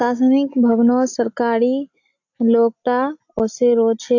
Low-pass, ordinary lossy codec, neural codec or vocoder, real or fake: 7.2 kHz; AAC, 48 kbps; none; real